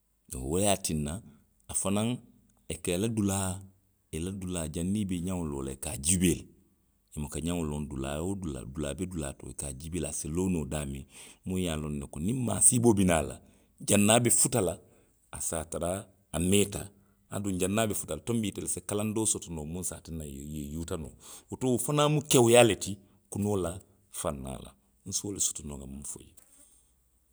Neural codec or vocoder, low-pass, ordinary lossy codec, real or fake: none; none; none; real